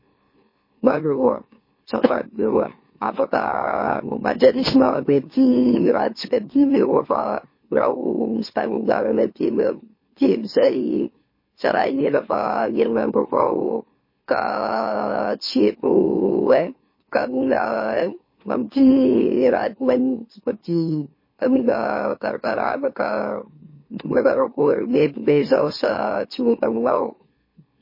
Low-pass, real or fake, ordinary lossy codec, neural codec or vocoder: 5.4 kHz; fake; MP3, 24 kbps; autoencoder, 44.1 kHz, a latent of 192 numbers a frame, MeloTTS